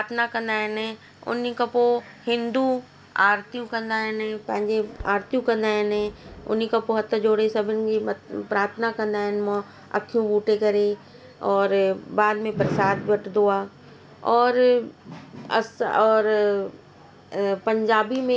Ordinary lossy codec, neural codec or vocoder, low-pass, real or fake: none; none; none; real